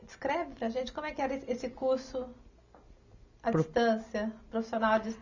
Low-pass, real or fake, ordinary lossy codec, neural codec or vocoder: 7.2 kHz; real; none; none